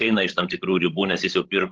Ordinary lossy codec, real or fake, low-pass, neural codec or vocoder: Opus, 16 kbps; fake; 7.2 kHz; codec, 16 kHz, 16 kbps, FreqCodec, larger model